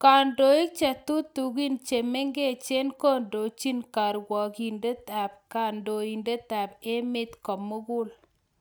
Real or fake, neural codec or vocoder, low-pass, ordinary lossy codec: real; none; none; none